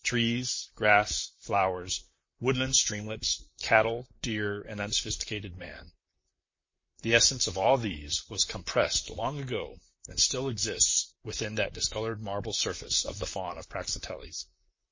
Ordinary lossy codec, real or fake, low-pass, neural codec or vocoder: MP3, 32 kbps; fake; 7.2 kHz; vocoder, 44.1 kHz, 128 mel bands, Pupu-Vocoder